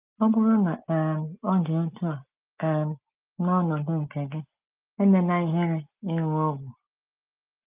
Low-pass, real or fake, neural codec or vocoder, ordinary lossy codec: 3.6 kHz; real; none; Opus, 24 kbps